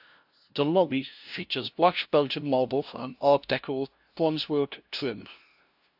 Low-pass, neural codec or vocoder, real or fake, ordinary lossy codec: 5.4 kHz; codec, 16 kHz, 0.5 kbps, FunCodec, trained on LibriTTS, 25 frames a second; fake; AAC, 48 kbps